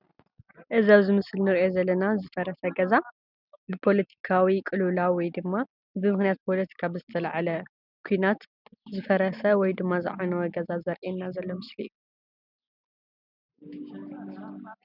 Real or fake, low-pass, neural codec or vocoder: real; 5.4 kHz; none